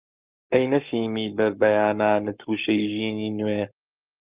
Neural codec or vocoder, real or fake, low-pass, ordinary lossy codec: codec, 44.1 kHz, 7.8 kbps, Pupu-Codec; fake; 3.6 kHz; Opus, 32 kbps